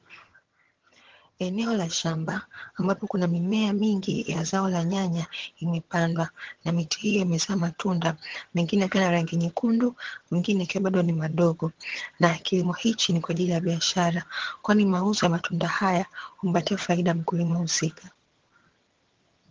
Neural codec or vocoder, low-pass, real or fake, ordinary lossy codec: vocoder, 22.05 kHz, 80 mel bands, HiFi-GAN; 7.2 kHz; fake; Opus, 16 kbps